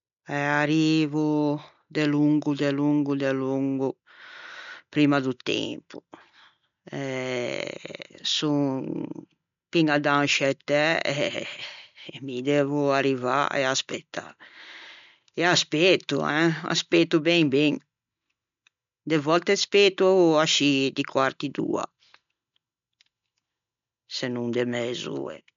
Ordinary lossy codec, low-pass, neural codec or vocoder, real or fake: MP3, 64 kbps; 7.2 kHz; none; real